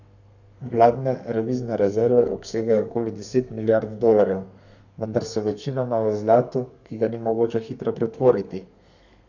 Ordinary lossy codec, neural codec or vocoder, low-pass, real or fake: none; codec, 32 kHz, 1.9 kbps, SNAC; 7.2 kHz; fake